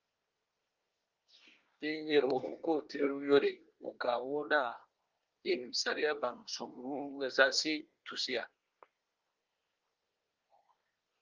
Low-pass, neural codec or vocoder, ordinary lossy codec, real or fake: 7.2 kHz; codec, 24 kHz, 1 kbps, SNAC; Opus, 24 kbps; fake